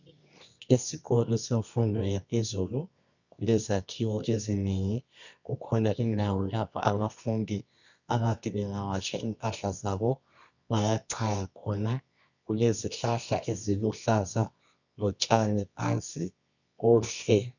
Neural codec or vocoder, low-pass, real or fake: codec, 24 kHz, 0.9 kbps, WavTokenizer, medium music audio release; 7.2 kHz; fake